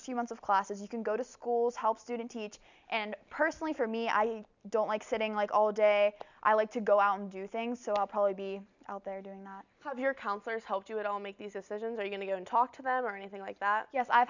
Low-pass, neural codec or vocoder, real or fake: 7.2 kHz; none; real